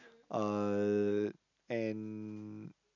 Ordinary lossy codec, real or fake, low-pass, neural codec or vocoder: none; real; 7.2 kHz; none